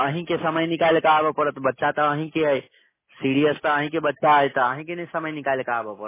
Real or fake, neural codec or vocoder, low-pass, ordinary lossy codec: real; none; 3.6 kHz; MP3, 16 kbps